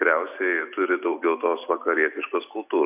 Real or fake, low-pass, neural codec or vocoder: real; 3.6 kHz; none